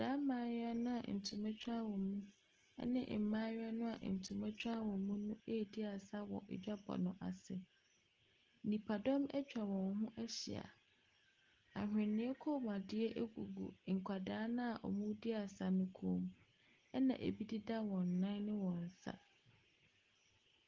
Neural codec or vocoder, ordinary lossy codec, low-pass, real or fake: none; Opus, 16 kbps; 7.2 kHz; real